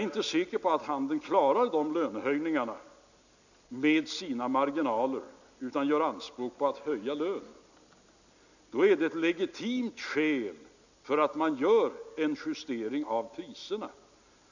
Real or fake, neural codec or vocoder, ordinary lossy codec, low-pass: real; none; none; 7.2 kHz